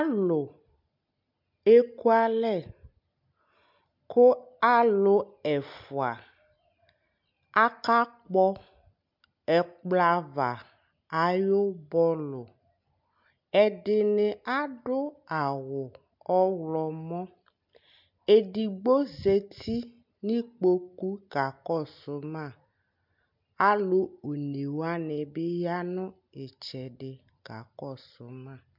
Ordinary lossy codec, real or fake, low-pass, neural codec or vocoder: MP3, 48 kbps; real; 5.4 kHz; none